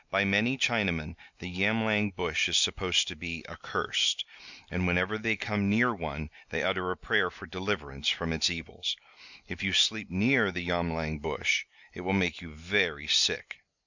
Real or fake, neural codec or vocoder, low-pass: real; none; 7.2 kHz